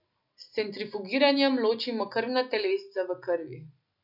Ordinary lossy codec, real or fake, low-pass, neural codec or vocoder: none; real; 5.4 kHz; none